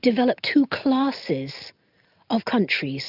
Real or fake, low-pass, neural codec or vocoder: real; 5.4 kHz; none